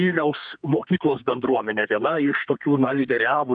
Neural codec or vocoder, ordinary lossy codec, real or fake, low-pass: codec, 32 kHz, 1.9 kbps, SNAC; MP3, 64 kbps; fake; 9.9 kHz